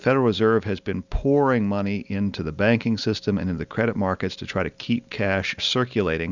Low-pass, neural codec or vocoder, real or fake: 7.2 kHz; none; real